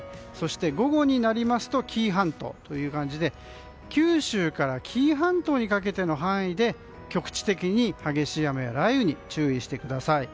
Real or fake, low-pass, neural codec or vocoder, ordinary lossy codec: real; none; none; none